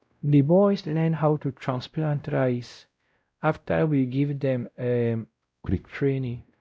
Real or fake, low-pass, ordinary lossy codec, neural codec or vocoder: fake; none; none; codec, 16 kHz, 0.5 kbps, X-Codec, WavLM features, trained on Multilingual LibriSpeech